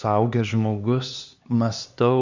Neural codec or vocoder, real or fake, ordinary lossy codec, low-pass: codec, 16 kHz, 2 kbps, X-Codec, HuBERT features, trained on LibriSpeech; fake; Opus, 64 kbps; 7.2 kHz